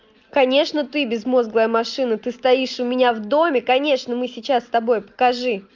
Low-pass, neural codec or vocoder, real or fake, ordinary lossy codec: 7.2 kHz; none; real; Opus, 24 kbps